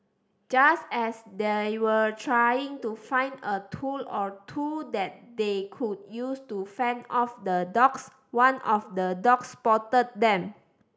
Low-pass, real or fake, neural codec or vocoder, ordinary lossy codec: none; real; none; none